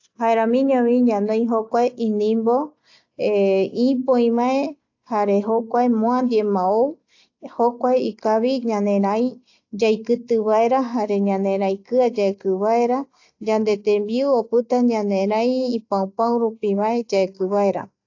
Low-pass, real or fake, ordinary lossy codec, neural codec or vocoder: 7.2 kHz; real; AAC, 48 kbps; none